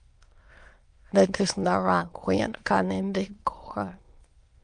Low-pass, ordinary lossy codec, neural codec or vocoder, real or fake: 9.9 kHz; Opus, 32 kbps; autoencoder, 22.05 kHz, a latent of 192 numbers a frame, VITS, trained on many speakers; fake